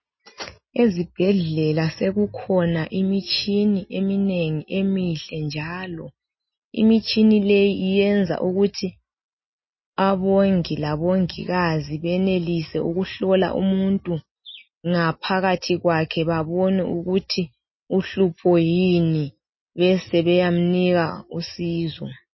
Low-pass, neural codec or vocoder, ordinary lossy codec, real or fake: 7.2 kHz; none; MP3, 24 kbps; real